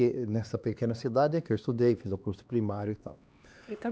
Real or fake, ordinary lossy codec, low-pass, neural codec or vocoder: fake; none; none; codec, 16 kHz, 2 kbps, X-Codec, HuBERT features, trained on LibriSpeech